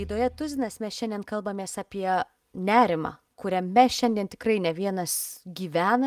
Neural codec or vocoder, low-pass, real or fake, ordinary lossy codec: none; 14.4 kHz; real; Opus, 32 kbps